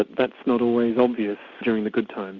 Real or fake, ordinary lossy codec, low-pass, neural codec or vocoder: real; Opus, 64 kbps; 7.2 kHz; none